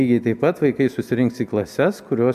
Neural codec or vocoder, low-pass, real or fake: none; 14.4 kHz; real